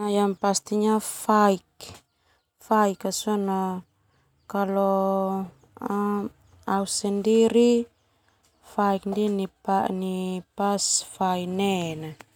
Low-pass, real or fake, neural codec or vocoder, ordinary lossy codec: 19.8 kHz; real; none; none